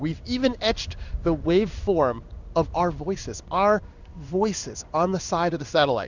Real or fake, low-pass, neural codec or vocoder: fake; 7.2 kHz; codec, 16 kHz in and 24 kHz out, 1 kbps, XY-Tokenizer